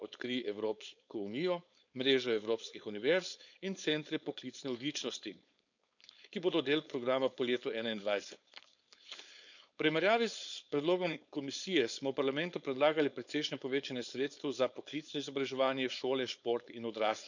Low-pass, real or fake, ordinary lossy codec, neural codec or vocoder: 7.2 kHz; fake; none; codec, 16 kHz, 4.8 kbps, FACodec